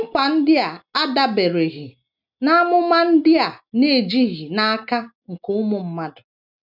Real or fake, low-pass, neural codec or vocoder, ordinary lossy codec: real; 5.4 kHz; none; none